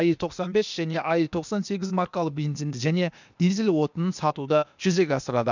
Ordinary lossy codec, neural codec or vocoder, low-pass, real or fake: none; codec, 16 kHz, 0.8 kbps, ZipCodec; 7.2 kHz; fake